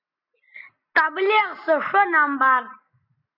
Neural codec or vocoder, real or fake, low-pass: none; real; 5.4 kHz